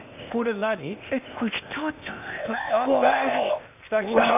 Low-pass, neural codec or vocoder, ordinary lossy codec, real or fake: 3.6 kHz; codec, 16 kHz, 0.8 kbps, ZipCodec; none; fake